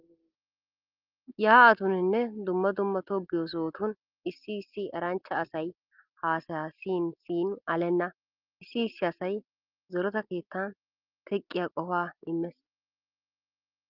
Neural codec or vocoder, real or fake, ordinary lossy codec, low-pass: none; real; Opus, 32 kbps; 5.4 kHz